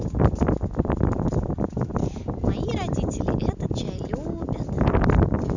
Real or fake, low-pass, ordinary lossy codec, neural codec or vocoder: real; 7.2 kHz; none; none